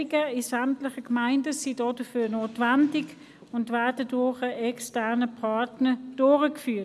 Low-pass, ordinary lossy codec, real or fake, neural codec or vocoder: none; none; real; none